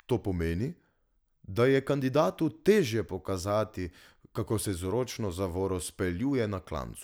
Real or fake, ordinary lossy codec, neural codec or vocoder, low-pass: real; none; none; none